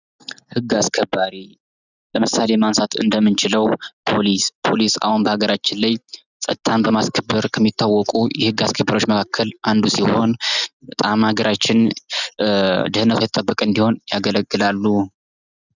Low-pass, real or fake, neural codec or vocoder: 7.2 kHz; real; none